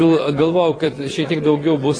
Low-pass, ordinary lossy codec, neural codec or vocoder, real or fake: 9.9 kHz; AAC, 32 kbps; vocoder, 22.05 kHz, 80 mel bands, Vocos; fake